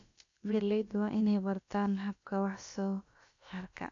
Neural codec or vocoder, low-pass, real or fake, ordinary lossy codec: codec, 16 kHz, about 1 kbps, DyCAST, with the encoder's durations; 7.2 kHz; fake; AAC, 48 kbps